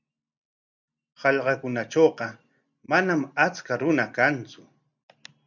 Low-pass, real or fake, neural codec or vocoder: 7.2 kHz; real; none